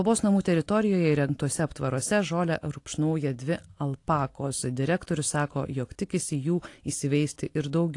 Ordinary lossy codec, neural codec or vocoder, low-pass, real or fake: AAC, 48 kbps; none; 10.8 kHz; real